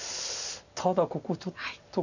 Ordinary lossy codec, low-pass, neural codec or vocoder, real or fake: none; 7.2 kHz; none; real